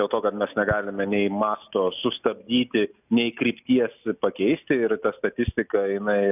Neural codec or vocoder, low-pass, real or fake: none; 3.6 kHz; real